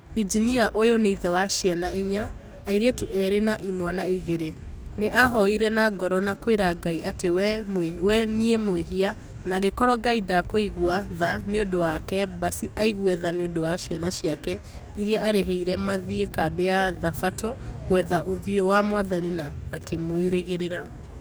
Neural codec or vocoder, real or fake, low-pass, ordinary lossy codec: codec, 44.1 kHz, 2.6 kbps, DAC; fake; none; none